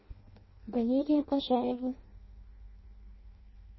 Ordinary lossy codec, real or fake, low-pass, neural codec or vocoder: MP3, 24 kbps; fake; 7.2 kHz; codec, 16 kHz in and 24 kHz out, 0.6 kbps, FireRedTTS-2 codec